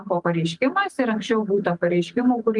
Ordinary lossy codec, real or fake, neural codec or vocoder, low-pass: Opus, 32 kbps; real; none; 10.8 kHz